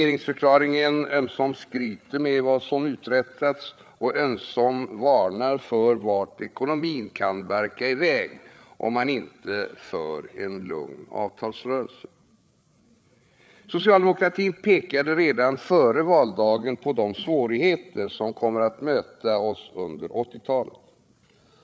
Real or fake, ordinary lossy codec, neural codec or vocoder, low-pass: fake; none; codec, 16 kHz, 8 kbps, FreqCodec, larger model; none